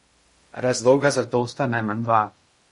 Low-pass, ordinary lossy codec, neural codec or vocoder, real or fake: 10.8 kHz; MP3, 48 kbps; codec, 16 kHz in and 24 kHz out, 0.6 kbps, FocalCodec, streaming, 2048 codes; fake